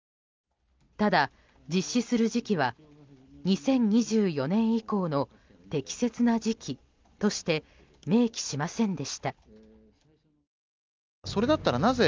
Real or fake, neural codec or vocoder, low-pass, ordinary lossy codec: real; none; 7.2 kHz; Opus, 24 kbps